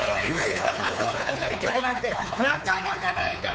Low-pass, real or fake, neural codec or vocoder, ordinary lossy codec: none; fake; codec, 16 kHz, 4 kbps, X-Codec, WavLM features, trained on Multilingual LibriSpeech; none